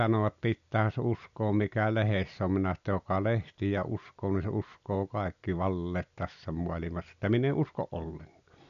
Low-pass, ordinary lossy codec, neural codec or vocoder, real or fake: 7.2 kHz; none; none; real